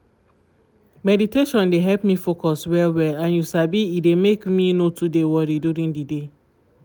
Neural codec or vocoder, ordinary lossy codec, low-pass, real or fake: none; none; none; real